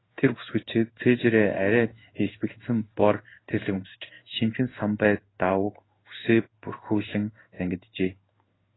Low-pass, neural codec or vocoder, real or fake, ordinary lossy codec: 7.2 kHz; codec, 16 kHz in and 24 kHz out, 1 kbps, XY-Tokenizer; fake; AAC, 16 kbps